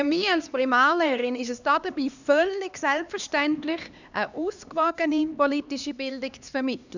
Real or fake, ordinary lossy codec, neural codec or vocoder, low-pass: fake; none; codec, 16 kHz, 2 kbps, X-Codec, HuBERT features, trained on LibriSpeech; 7.2 kHz